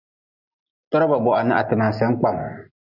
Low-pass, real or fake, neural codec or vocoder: 5.4 kHz; real; none